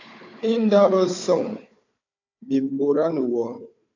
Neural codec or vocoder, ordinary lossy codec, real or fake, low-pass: codec, 16 kHz, 4 kbps, FunCodec, trained on Chinese and English, 50 frames a second; AAC, 48 kbps; fake; 7.2 kHz